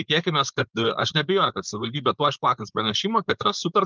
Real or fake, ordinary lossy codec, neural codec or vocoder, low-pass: fake; Opus, 32 kbps; codec, 16 kHz, 4.8 kbps, FACodec; 7.2 kHz